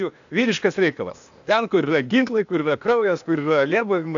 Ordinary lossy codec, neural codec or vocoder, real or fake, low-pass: AAC, 64 kbps; codec, 16 kHz, 0.8 kbps, ZipCodec; fake; 7.2 kHz